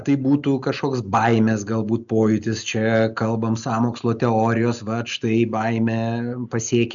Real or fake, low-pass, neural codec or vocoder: real; 7.2 kHz; none